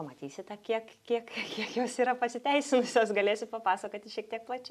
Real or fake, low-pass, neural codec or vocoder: real; 14.4 kHz; none